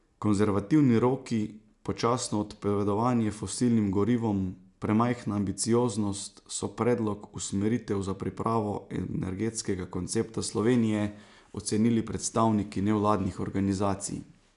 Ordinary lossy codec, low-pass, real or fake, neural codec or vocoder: none; 10.8 kHz; real; none